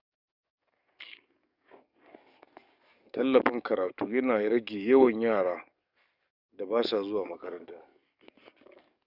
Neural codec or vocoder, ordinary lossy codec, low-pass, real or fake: codec, 44.1 kHz, 7.8 kbps, DAC; Opus, 64 kbps; 5.4 kHz; fake